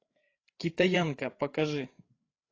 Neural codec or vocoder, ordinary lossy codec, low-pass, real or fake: codec, 16 kHz, 8 kbps, FreqCodec, larger model; AAC, 32 kbps; 7.2 kHz; fake